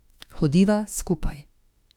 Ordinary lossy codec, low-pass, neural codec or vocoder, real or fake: none; 19.8 kHz; autoencoder, 48 kHz, 32 numbers a frame, DAC-VAE, trained on Japanese speech; fake